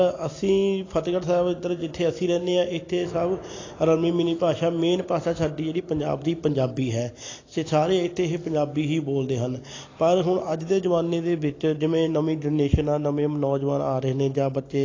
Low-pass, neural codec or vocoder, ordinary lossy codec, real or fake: 7.2 kHz; none; AAC, 32 kbps; real